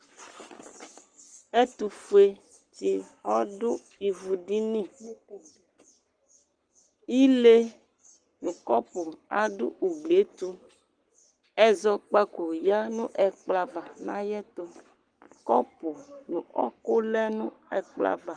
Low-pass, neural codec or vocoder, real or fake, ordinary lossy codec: 9.9 kHz; codec, 44.1 kHz, 7.8 kbps, Pupu-Codec; fake; Opus, 24 kbps